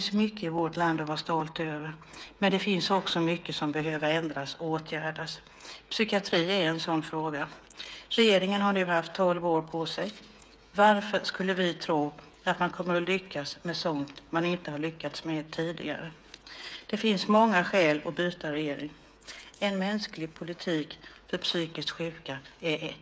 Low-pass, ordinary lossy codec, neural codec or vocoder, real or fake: none; none; codec, 16 kHz, 8 kbps, FreqCodec, smaller model; fake